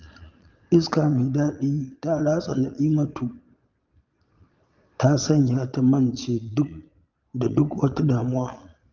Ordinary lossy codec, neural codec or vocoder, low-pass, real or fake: Opus, 32 kbps; vocoder, 22.05 kHz, 80 mel bands, Vocos; 7.2 kHz; fake